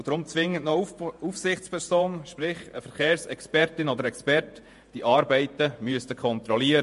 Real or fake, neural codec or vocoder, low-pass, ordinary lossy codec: fake; vocoder, 48 kHz, 128 mel bands, Vocos; 14.4 kHz; MP3, 48 kbps